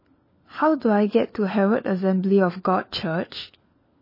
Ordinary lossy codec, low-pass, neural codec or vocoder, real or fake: MP3, 24 kbps; 5.4 kHz; codec, 16 kHz, 4 kbps, FunCodec, trained on LibriTTS, 50 frames a second; fake